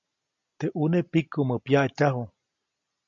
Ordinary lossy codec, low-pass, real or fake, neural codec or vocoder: MP3, 48 kbps; 7.2 kHz; real; none